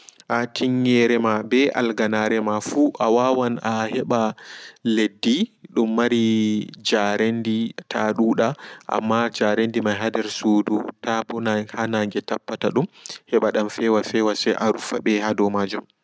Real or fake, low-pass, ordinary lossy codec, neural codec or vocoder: real; none; none; none